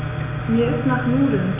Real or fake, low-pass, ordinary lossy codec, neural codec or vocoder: real; 3.6 kHz; none; none